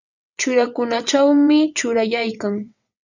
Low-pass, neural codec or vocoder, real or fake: 7.2 kHz; vocoder, 22.05 kHz, 80 mel bands, WaveNeXt; fake